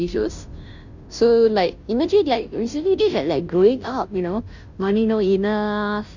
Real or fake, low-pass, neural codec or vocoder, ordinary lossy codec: fake; 7.2 kHz; codec, 16 kHz, 0.5 kbps, FunCodec, trained on Chinese and English, 25 frames a second; none